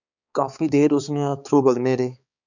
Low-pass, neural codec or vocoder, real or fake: 7.2 kHz; codec, 16 kHz, 4 kbps, X-Codec, HuBERT features, trained on balanced general audio; fake